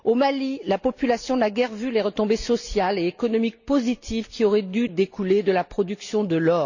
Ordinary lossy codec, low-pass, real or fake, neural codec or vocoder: none; 7.2 kHz; real; none